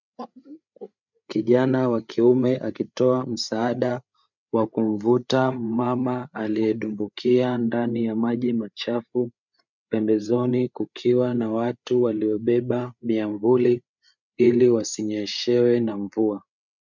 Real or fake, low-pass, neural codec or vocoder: fake; 7.2 kHz; codec, 16 kHz, 8 kbps, FreqCodec, larger model